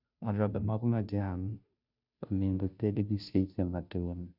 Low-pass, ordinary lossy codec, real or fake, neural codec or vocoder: 5.4 kHz; none; fake; codec, 16 kHz, 0.5 kbps, FunCodec, trained on Chinese and English, 25 frames a second